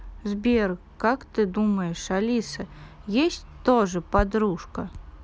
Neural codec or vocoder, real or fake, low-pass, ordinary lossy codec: none; real; none; none